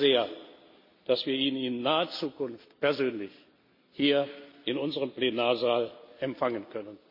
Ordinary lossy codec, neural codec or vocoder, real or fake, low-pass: MP3, 32 kbps; none; real; 5.4 kHz